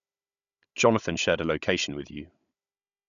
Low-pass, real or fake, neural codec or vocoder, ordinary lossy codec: 7.2 kHz; fake; codec, 16 kHz, 16 kbps, FunCodec, trained on Chinese and English, 50 frames a second; none